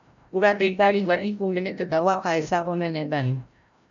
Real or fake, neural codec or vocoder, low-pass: fake; codec, 16 kHz, 0.5 kbps, FreqCodec, larger model; 7.2 kHz